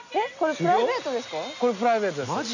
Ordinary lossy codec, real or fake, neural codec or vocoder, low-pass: none; real; none; 7.2 kHz